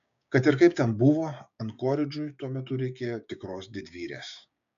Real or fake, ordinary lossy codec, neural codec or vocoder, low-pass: fake; MP3, 64 kbps; codec, 16 kHz, 6 kbps, DAC; 7.2 kHz